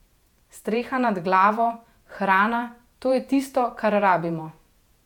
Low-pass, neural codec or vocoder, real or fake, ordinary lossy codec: 19.8 kHz; vocoder, 48 kHz, 128 mel bands, Vocos; fake; MP3, 96 kbps